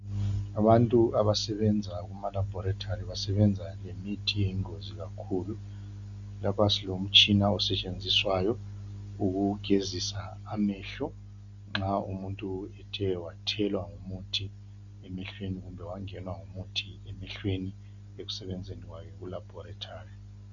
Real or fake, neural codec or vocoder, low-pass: real; none; 7.2 kHz